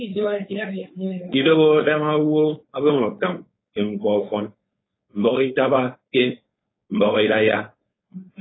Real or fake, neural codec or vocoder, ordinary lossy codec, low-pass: fake; codec, 16 kHz, 4.8 kbps, FACodec; AAC, 16 kbps; 7.2 kHz